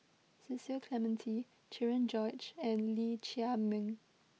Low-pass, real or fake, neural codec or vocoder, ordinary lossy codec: none; real; none; none